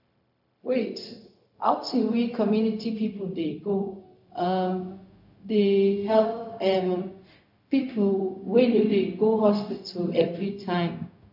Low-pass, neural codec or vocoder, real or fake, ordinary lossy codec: 5.4 kHz; codec, 16 kHz, 0.4 kbps, LongCat-Audio-Codec; fake; none